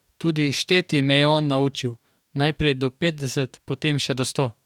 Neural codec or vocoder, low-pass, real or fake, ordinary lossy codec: codec, 44.1 kHz, 2.6 kbps, DAC; 19.8 kHz; fake; none